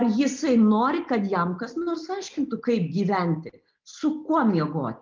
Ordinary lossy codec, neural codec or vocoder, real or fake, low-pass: Opus, 32 kbps; none; real; 7.2 kHz